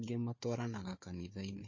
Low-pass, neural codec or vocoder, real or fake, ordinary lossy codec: 7.2 kHz; codec, 16 kHz, 4 kbps, FunCodec, trained on Chinese and English, 50 frames a second; fake; MP3, 32 kbps